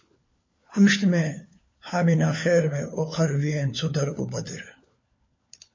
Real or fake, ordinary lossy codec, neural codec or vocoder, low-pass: fake; MP3, 32 kbps; codec, 16 kHz, 4 kbps, FunCodec, trained on LibriTTS, 50 frames a second; 7.2 kHz